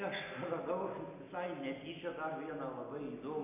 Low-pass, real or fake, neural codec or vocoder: 3.6 kHz; real; none